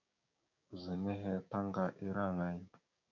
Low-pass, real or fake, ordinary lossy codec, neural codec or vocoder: 7.2 kHz; fake; MP3, 48 kbps; codec, 16 kHz, 6 kbps, DAC